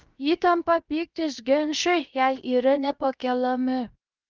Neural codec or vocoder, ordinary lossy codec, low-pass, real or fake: codec, 16 kHz, 0.7 kbps, FocalCodec; Opus, 32 kbps; 7.2 kHz; fake